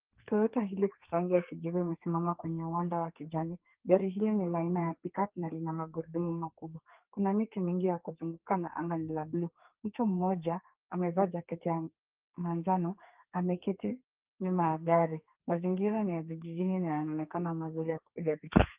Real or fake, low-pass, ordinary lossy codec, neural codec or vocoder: fake; 3.6 kHz; Opus, 24 kbps; codec, 32 kHz, 1.9 kbps, SNAC